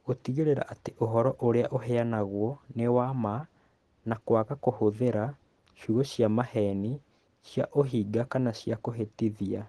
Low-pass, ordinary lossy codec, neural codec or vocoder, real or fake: 10.8 kHz; Opus, 16 kbps; none; real